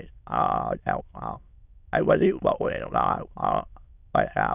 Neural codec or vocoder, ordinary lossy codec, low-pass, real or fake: autoencoder, 22.05 kHz, a latent of 192 numbers a frame, VITS, trained on many speakers; none; 3.6 kHz; fake